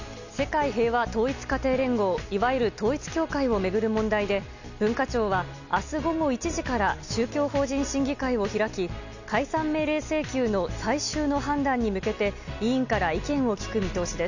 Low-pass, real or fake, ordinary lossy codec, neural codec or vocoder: 7.2 kHz; real; none; none